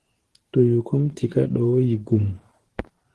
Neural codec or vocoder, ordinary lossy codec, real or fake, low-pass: vocoder, 44.1 kHz, 128 mel bands every 512 samples, BigVGAN v2; Opus, 16 kbps; fake; 10.8 kHz